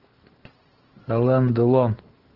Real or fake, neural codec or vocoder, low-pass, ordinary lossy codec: real; none; 5.4 kHz; Opus, 16 kbps